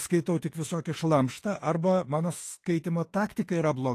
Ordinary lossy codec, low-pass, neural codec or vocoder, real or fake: AAC, 48 kbps; 14.4 kHz; autoencoder, 48 kHz, 32 numbers a frame, DAC-VAE, trained on Japanese speech; fake